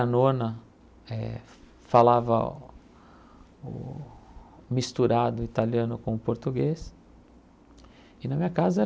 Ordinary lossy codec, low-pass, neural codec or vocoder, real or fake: none; none; none; real